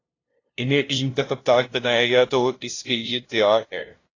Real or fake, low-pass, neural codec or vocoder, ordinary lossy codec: fake; 7.2 kHz; codec, 16 kHz, 0.5 kbps, FunCodec, trained on LibriTTS, 25 frames a second; AAC, 32 kbps